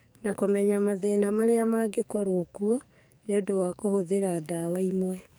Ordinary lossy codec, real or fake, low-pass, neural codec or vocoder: none; fake; none; codec, 44.1 kHz, 2.6 kbps, SNAC